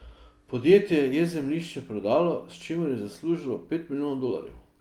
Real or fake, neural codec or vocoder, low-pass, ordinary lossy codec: real; none; 19.8 kHz; Opus, 32 kbps